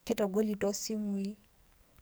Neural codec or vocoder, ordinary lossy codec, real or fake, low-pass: codec, 44.1 kHz, 2.6 kbps, SNAC; none; fake; none